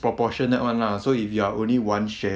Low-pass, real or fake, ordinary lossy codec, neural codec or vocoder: none; real; none; none